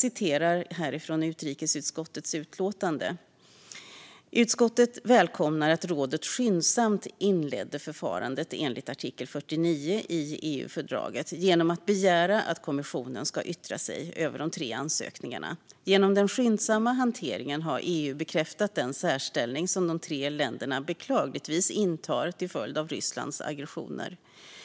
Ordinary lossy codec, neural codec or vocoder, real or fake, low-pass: none; none; real; none